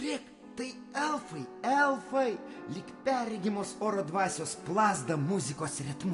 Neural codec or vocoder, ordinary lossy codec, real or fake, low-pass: none; AAC, 64 kbps; real; 10.8 kHz